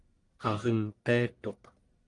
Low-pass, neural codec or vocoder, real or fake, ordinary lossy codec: 10.8 kHz; codec, 44.1 kHz, 1.7 kbps, Pupu-Codec; fake; Opus, 32 kbps